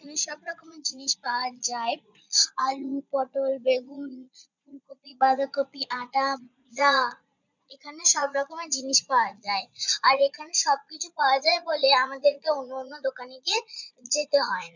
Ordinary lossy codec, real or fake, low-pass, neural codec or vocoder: none; fake; 7.2 kHz; vocoder, 22.05 kHz, 80 mel bands, Vocos